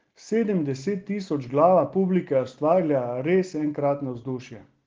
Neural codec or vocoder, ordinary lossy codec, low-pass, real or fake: none; Opus, 16 kbps; 7.2 kHz; real